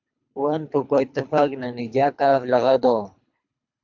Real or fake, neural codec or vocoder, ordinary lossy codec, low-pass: fake; codec, 24 kHz, 3 kbps, HILCodec; MP3, 64 kbps; 7.2 kHz